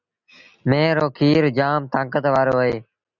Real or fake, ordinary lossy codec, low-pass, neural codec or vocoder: real; Opus, 64 kbps; 7.2 kHz; none